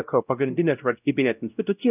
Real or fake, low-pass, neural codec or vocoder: fake; 3.6 kHz; codec, 16 kHz, 0.5 kbps, X-Codec, WavLM features, trained on Multilingual LibriSpeech